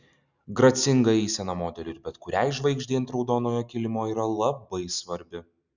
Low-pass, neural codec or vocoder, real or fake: 7.2 kHz; none; real